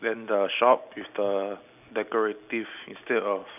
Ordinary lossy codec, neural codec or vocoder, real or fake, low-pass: none; none; real; 3.6 kHz